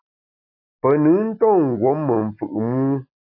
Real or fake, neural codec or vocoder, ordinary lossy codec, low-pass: real; none; AAC, 48 kbps; 5.4 kHz